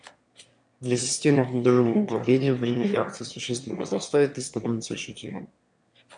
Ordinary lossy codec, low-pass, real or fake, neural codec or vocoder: MP3, 64 kbps; 9.9 kHz; fake; autoencoder, 22.05 kHz, a latent of 192 numbers a frame, VITS, trained on one speaker